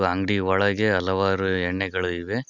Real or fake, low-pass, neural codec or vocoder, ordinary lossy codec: real; 7.2 kHz; none; none